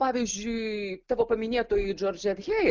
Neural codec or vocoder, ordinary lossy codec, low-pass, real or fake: none; Opus, 32 kbps; 7.2 kHz; real